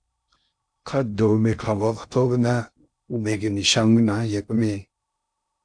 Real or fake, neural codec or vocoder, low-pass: fake; codec, 16 kHz in and 24 kHz out, 0.6 kbps, FocalCodec, streaming, 2048 codes; 9.9 kHz